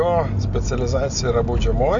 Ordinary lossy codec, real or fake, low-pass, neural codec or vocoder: MP3, 64 kbps; real; 7.2 kHz; none